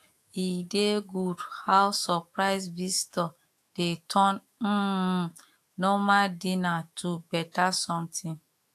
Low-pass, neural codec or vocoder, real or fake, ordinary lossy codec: 14.4 kHz; autoencoder, 48 kHz, 128 numbers a frame, DAC-VAE, trained on Japanese speech; fake; AAC, 64 kbps